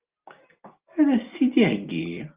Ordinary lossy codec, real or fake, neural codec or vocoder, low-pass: Opus, 24 kbps; real; none; 3.6 kHz